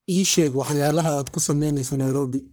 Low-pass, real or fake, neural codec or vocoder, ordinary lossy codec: none; fake; codec, 44.1 kHz, 1.7 kbps, Pupu-Codec; none